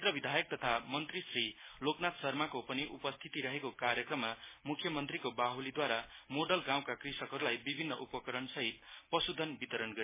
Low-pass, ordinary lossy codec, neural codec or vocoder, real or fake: 3.6 kHz; MP3, 16 kbps; none; real